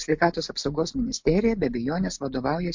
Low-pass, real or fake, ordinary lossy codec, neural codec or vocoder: 7.2 kHz; real; MP3, 48 kbps; none